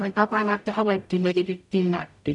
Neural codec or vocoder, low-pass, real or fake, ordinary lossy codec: codec, 44.1 kHz, 0.9 kbps, DAC; 10.8 kHz; fake; none